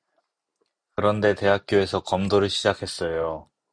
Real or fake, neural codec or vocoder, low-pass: real; none; 9.9 kHz